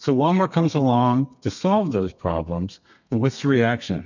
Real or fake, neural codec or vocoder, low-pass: fake; codec, 32 kHz, 1.9 kbps, SNAC; 7.2 kHz